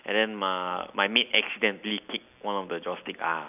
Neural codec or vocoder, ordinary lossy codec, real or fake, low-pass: none; none; real; 3.6 kHz